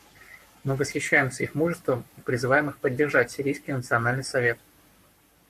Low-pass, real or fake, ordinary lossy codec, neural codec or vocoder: 14.4 kHz; fake; MP3, 64 kbps; codec, 44.1 kHz, 7.8 kbps, Pupu-Codec